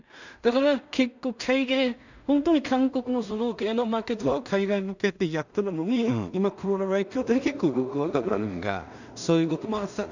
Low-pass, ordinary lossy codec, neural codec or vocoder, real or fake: 7.2 kHz; none; codec, 16 kHz in and 24 kHz out, 0.4 kbps, LongCat-Audio-Codec, two codebook decoder; fake